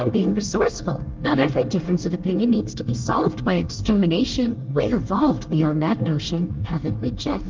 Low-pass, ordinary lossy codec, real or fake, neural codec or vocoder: 7.2 kHz; Opus, 32 kbps; fake; codec, 24 kHz, 1 kbps, SNAC